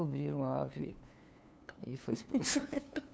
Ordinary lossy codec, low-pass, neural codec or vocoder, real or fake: none; none; codec, 16 kHz, 2 kbps, FunCodec, trained on LibriTTS, 25 frames a second; fake